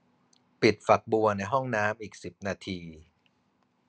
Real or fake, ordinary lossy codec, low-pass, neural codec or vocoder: real; none; none; none